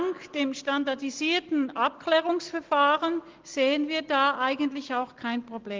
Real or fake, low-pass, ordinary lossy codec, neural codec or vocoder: real; 7.2 kHz; Opus, 16 kbps; none